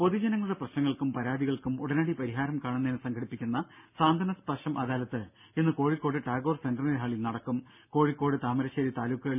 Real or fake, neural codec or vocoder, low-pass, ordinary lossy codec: real; none; 3.6 kHz; none